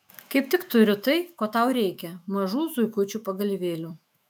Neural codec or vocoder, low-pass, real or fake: autoencoder, 48 kHz, 128 numbers a frame, DAC-VAE, trained on Japanese speech; 19.8 kHz; fake